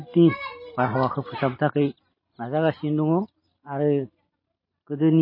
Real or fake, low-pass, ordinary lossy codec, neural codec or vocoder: real; 5.4 kHz; MP3, 24 kbps; none